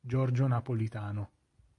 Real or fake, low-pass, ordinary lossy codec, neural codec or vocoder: real; 10.8 kHz; MP3, 48 kbps; none